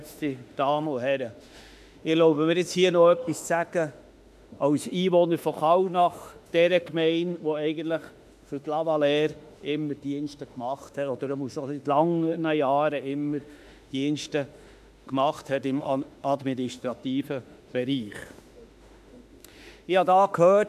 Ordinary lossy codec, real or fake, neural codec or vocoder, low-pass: AAC, 96 kbps; fake; autoencoder, 48 kHz, 32 numbers a frame, DAC-VAE, trained on Japanese speech; 14.4 kHz